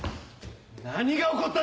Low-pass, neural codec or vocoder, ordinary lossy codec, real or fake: none; none; none; real